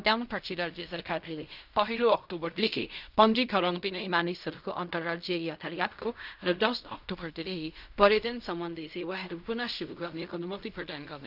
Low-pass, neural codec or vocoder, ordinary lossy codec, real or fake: 5.4 kHz; codec, 16 kHz in and 24 kHz out, 0.4 kbps, LongCat-Audio-Codec, fine tuned four codebook decoder; none; fake